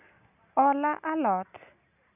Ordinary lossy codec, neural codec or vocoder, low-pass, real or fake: none; none; 3.6 kHz; real